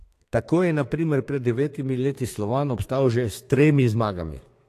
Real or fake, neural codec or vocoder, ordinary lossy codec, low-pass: fake; codec, 32 kHz, 1.9 kbps, SNAC; AAC, 64 kbps; 14.4 kHz